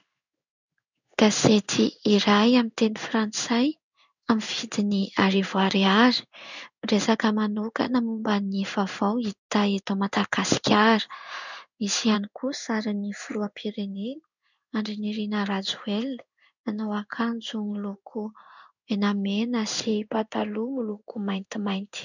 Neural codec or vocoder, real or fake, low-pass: codec, 16 kHz in and 24 kHz out, 1 kbps, XY-Tokenizer; fake; 7.2 kHz